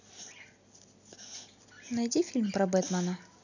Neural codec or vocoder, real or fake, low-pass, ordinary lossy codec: none; real; 7.2 kHz; none